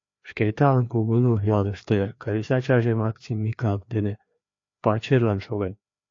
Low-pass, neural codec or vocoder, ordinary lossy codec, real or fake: 7.2 kHz; codec, 16 kHz, 2 kbps, FreqCodec, larger model; AAC, 48 kbps; fake